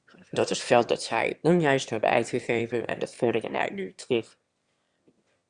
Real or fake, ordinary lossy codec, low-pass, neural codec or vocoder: fake; Opus, 64 kbps; 9.9 kHz; autoencoder, 22.05 kHz, a latent of 192 numbers a frame, VITS, trained on one speaker